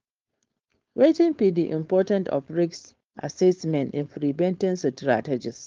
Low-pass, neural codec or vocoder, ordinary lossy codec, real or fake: 7.2 kHz; codec, 16 kHz, 4.8 kbps, FACodec; Opus, 32 kbps; fake